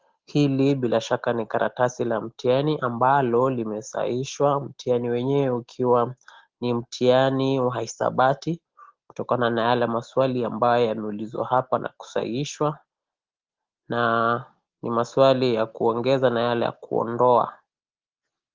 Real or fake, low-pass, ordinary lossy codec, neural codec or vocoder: real; 7.2 kHz; Opus, 16 kbps; none